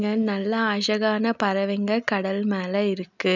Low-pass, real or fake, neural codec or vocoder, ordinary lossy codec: 7.2 kHz; real; none; none